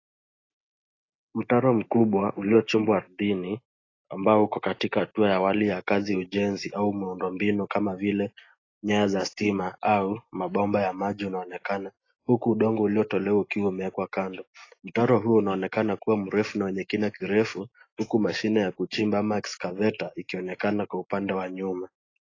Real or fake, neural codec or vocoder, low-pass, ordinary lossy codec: real; none; 7.2 kHz; AAC, 32 kbps